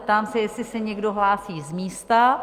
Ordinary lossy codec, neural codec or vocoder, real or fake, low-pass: Opus, 32 kbps; none; real; 14.4 kHz